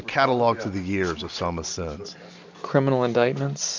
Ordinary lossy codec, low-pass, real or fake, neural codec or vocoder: MP3, 64 kbps; 7.2 kHz; real; none